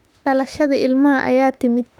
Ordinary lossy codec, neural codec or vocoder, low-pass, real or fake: none; autoencoder, 48 kHz, 32 numbers a frame, DAC-VAE, trained on Japanese speech; 19.8 kHz; fake